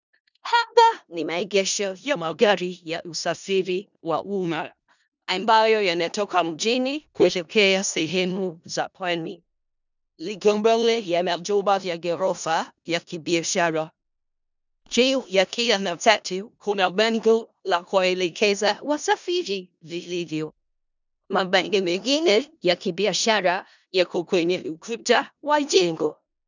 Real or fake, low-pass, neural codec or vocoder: fake; 7.2 kHz; codec, 16 kHz in and 24 kHz out, 0.4 kbps, LongCat-Audio-Codec, four codebook decoder